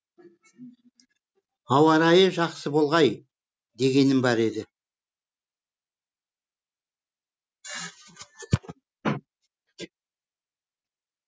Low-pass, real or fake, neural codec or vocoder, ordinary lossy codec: none; real; none; none